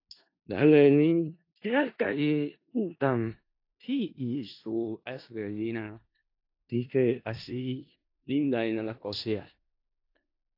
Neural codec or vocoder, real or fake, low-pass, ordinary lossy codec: codec, 16 kHz in and 24 kHz out, 0.4 kbps, LongCat-Audio-Codec, four codebook decoder; fake; 5.4 kHz; AAC, 32 kbps